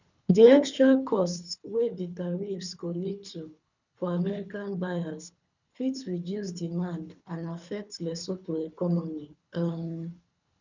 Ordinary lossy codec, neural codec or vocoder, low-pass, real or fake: none; codec, 24 kHz, 3 kbps, HILCodec; 7.2 kHz; fake